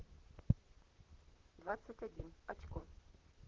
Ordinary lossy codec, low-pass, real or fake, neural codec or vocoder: Opus, 16 kbps; 7.2 kHz; fake; vocoder, 44.1 kHz, 128 mel bands, Pupu-Vocoder